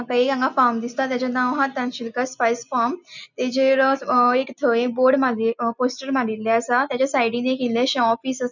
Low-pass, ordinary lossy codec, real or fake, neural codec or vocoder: 7.2 kHz; none; real; none